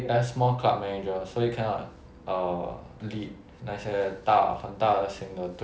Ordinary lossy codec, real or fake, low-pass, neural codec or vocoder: none; real; none; none